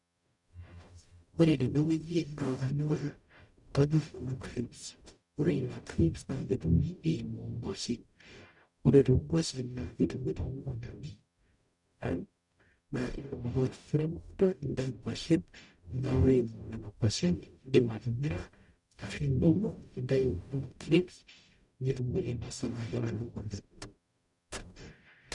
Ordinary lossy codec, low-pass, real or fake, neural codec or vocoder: none; 10.8 kHz; fake; codec, 44.1 kHz, 0.9 kbps, DAC